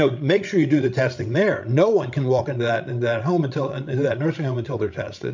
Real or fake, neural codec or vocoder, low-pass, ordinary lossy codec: fake; codec, 16 kHz, 16 kbps, FreqCodec, larger model; 7.2 kHz; MP3, 64 kbps